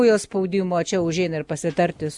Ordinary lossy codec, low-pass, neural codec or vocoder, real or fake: Opus, 64 kbps; 10.8 kHz; none; real